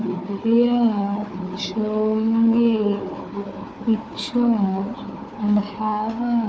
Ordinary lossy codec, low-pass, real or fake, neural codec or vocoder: none; none; fake; codec, 16 kHz, 4 kbps, FunCodec, trained on Chinese and English, 50 frames a second